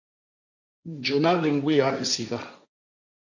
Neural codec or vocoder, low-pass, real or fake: codec, 16 kHz, 1.1 kbps, Voila-Tokenizer; 7.2 kHz; fake